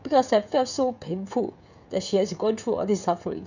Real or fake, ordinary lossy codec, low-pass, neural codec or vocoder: fake; none; 7.2 kHz; codec, 16 kHz, 16 kbps, FreqCodec, smaller model